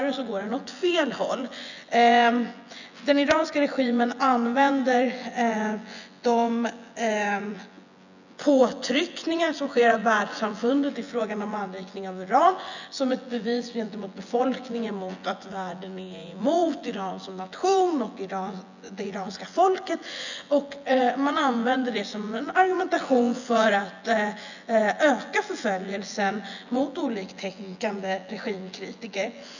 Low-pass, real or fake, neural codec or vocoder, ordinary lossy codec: 7.2 kHz; fake; vocoder, 24 kHz, 100 mel bands, Vocos; none